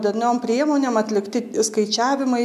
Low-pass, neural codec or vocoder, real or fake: 14.4 kHz; none; real